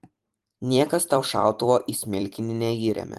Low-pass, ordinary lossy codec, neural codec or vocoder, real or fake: 14.4 kHz; Opus, 24 kbps; vocoder, 44.1 kHz, 128 mel bands every 256 samples, BigVGAN v2; fake